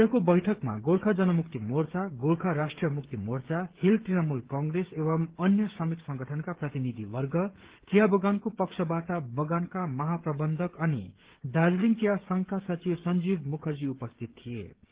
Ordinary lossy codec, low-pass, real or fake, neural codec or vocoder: Opus, 16 kbps; 3.6 kHz; fake; codec, 16 kHz, 16 kbps, FreqCodec, smaller model